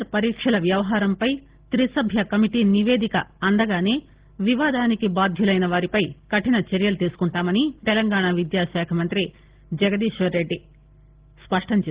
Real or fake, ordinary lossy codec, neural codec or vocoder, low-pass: real; Opus, 16 kbps; none; 3.6 kHz